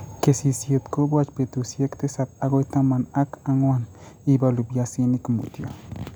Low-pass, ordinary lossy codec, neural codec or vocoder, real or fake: none; none; none; real